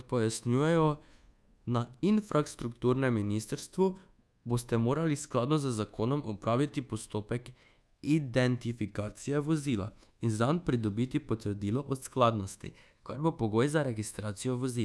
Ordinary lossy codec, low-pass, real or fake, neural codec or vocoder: none; none; fake; codec, 24 kHz, 1.2 kbps, DualCodec